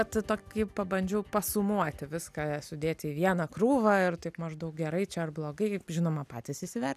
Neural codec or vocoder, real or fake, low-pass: none; real; 14.4 kHz